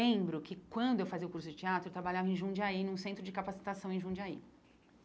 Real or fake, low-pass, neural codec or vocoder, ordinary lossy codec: real; none; none; none